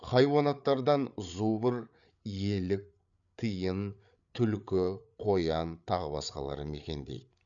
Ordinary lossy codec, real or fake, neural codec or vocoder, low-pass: none; fake; codec, 16 kHz, 8 kbps, FreqCodec, larger model; 7.2 kHz